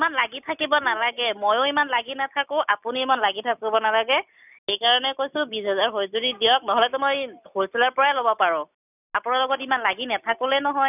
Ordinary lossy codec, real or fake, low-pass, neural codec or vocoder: none; real; 3.6 kHz; none